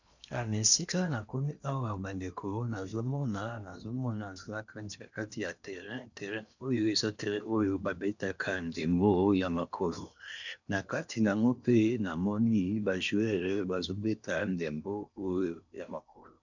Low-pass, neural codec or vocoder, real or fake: 7.2 kHz; codec, 16 kHz in and 24 kHz out, 0.8 kbps, FocalCodec, streaming, 65536 codes; fake